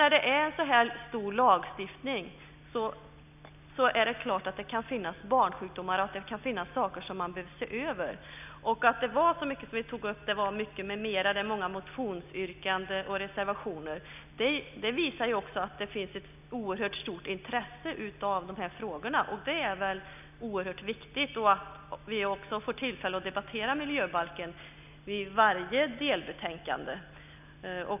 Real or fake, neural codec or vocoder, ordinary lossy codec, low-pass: real; none; none; 3.6 kHz